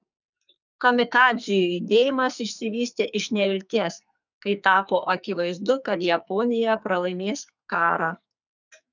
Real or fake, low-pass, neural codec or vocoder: fake; 7.2 kHz; codec, 44.1 kHz, 2.6 kbps, SNAC